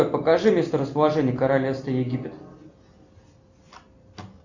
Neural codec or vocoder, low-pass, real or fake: none; 7.2 kHz; real